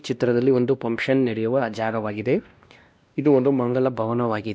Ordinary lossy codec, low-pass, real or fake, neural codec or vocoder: none; none; fake; codec, 16 kHz, 1 kbps, X-Codec, WavLM features, trained on Multilingual LibriSpeech